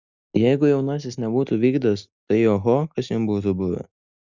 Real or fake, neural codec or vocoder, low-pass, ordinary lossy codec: real; none; 7.2 kHz; Opus, 64 kbps